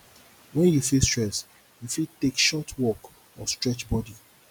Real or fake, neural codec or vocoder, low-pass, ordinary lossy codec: real; none; none; none